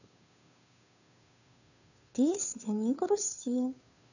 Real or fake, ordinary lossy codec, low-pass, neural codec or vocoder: fake; none; 7.2 kHz; codec, 16 kHz, 2 kbps, FunCodec, trained on Chinese and English, 25 frames a second